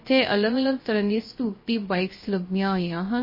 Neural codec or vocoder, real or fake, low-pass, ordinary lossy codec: codec, 16 kHz, 0.3 kbps, FocalCodec; fake; 5.4 kHz; MP3, 24 kbps